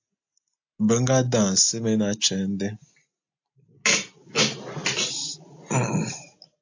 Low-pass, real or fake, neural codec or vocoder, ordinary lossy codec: 7.2 kHz; real; none; AAC, 48 kbps